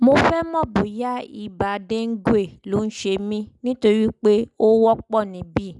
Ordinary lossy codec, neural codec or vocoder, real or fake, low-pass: none; none; real; 10.8 kHz